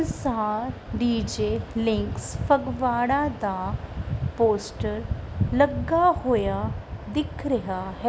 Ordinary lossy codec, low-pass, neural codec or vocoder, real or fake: none; none; none; real